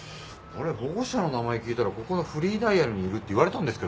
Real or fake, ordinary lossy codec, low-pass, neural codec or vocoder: real; none; none; none